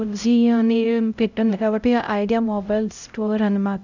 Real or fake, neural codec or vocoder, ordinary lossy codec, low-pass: fake; codec, 16 kHz, 0.5 kbps, X-Codec, HuBERT features, trained on LibriSpeech; none; 7.2 kHz